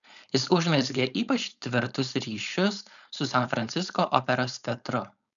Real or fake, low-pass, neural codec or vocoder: fake; 7.2 kHz; codec, 16 kHz, 4.8 kbps, FACodec